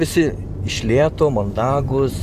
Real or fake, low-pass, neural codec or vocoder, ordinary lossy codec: fake; 14.4 kHz; vocoder, 44.1 kHz, 128 mel bands every 512 samples, BigVGAN v2; Opus, 64 kbps